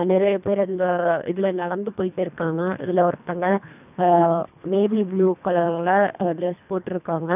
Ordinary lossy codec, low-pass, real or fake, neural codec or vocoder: none; 3.6 kHz; fake; codec, 24 kHz, 1.5 kbps, HILCodec